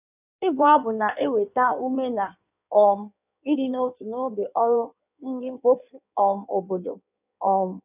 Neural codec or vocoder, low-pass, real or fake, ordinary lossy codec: codec, 16 kHz in and 24 kHz out, 1.1 kbps, FireRedTTS-2 codec; 3.6 kHz; fake; none